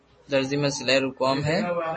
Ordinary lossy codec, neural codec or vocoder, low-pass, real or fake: MP3, 32 kbps; none; 10.8 kHz; real